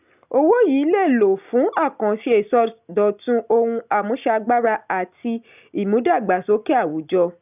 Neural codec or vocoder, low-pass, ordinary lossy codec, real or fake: none; 3.6 kHz; none; real